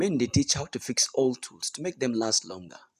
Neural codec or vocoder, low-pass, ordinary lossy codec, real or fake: none; 14.4 kHz; none; real